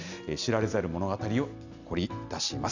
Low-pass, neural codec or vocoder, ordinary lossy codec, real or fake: 7.2 kHz; none; none; real